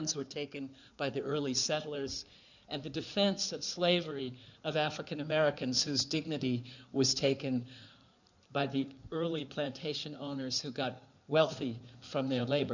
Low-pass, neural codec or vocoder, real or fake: 7.2 kHz; codec, 16 kHz in and 24 kHz out, 2.2 kbps, FireRedTTS-2 codec; fake